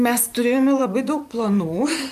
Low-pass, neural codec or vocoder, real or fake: 14.4 kHz; vocoder, 44.1 kHz, 128 mel bands, Pupu-Vocoder; fake